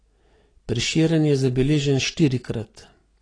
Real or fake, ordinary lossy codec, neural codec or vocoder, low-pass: real; AAC, 32 kbps; none; 9.9 kHz